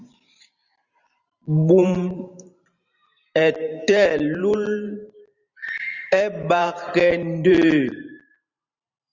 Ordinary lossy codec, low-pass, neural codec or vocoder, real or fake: Opus, 64 kbps; 7.2 kHz; vocoder, 44.1 kHz, 128 mel bands every 512 samples, BigVGAN v2; fake